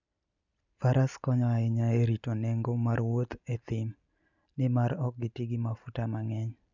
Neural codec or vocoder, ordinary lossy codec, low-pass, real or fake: none; none; 7.2 kHz; real